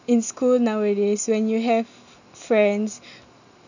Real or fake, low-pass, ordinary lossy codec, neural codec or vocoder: real; 7.2 kHz; none; none